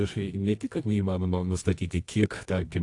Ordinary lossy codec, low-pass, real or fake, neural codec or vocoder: AAC, 48 kbps; 10.8 kHz; fake; codec, 24 kHz, 0.9 kbps, WavTokenizer, medium music audio release